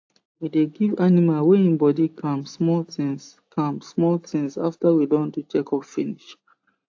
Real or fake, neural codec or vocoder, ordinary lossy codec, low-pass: real; none; AAC, 48 kbps; 7.2 kHz